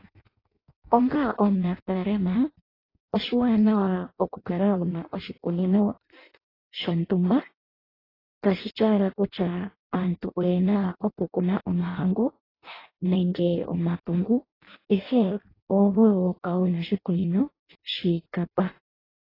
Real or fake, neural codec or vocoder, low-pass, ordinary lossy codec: fake; codec, 16 kHz in and 24 kHz out, 0.6 kbps, FireRedTTS-2 codec; 5.4 kHz; AAC, 24 kbps